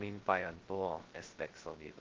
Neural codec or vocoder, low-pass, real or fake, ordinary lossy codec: codec, 16 kHz, 0.2 kbps, FocalCodec; 7.2 kHz; fake; Opus, 16 kbps